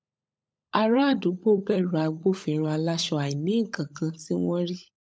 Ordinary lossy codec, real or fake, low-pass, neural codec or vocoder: none; fake; none; codec, 16 kHz, 16 kbps, FunCodec, trained on LibriTTS, 50 frames a second